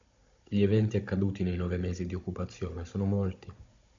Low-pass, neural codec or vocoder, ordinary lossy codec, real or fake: 7.2 kHz; codec, 16 kHz, 16 kbps, FunCodec, trained on Chinese and English, 50 frames a second; MP3, 64 kbps; fake